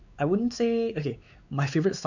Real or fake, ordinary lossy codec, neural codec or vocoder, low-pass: fake; none; codec, 16 kHz, 4 kbps, X-Codec, WavLM features, trained on Multilingual LibriSpeech; 7.2 kHz